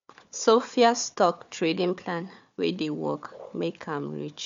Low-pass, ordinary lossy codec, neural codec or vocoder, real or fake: 7.2 kHz; MP3, 64 kbps; codec, 16 kHz, 4 kbps, FunCodec, trained on Chinese and English, 50 frames a second; fake